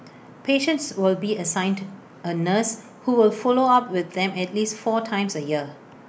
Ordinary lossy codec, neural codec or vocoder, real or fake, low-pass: none; none; real; none